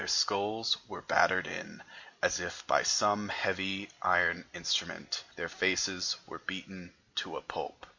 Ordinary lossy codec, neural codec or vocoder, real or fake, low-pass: MP3, 48 kbps; none; real; 7.2 kHz